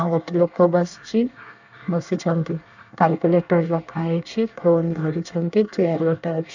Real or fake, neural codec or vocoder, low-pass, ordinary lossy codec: fake; codec, 24 kHz, 1 kbps, SNAC; 7.2 kHz; none